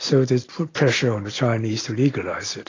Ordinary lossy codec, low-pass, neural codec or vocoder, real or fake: AAC, 32 kbps; 7.2 kHz; none; real